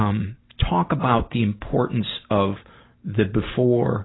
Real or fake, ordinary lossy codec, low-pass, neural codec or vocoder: real; AAC, 16 kbps; 7.2 kHz; none